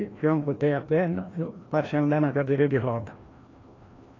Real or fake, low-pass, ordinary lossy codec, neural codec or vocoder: fake; 7.2 kHz; AAC, 32 kbps; codec, 16 kHz, 1 kbps, FreqCodec, larger model